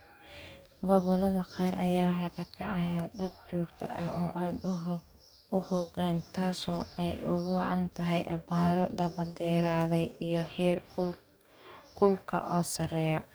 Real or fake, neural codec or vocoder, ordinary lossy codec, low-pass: fake; codec, 44.1 kHz, 2.6 kbps, DAC; none; none